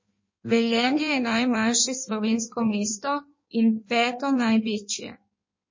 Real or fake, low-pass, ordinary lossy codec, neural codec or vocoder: fake; 7.2 kHz; MP3, 32 kbps; codec, 16 kHz in and 24 kHz out, 1.1 kbps, FireRedTTS-2 codec